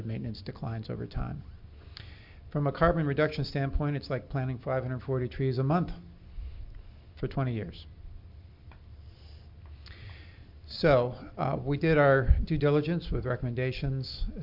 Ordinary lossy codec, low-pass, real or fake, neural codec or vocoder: MP3, 48 kbps; 5.4 kHz; real; none